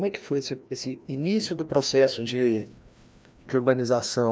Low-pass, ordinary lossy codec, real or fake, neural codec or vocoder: none; none; fake; codec, 16 kHz, 1 kbps, FreqCodec, larger model